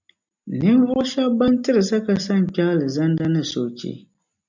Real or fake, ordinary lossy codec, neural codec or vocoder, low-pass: real; MP3, 64 kbps; none; 7.2 kHz